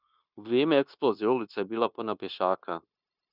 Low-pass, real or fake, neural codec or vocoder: 5.4 kHz; fake; codec, 16 kHz, 0.9 kbps, LongCat-Audio-Codec